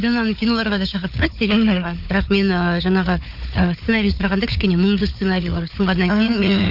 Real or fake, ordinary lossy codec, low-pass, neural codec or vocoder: fake; none; 5.4 kHz; codec, 16 kHz, 4 kbps, FunCodec, trained on Chinese and English, 50 frames a second